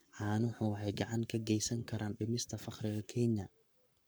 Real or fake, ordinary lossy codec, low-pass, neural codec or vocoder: fake; none; none; codec, 44.1 kHz, 7.8 kbps, Pupu-Codec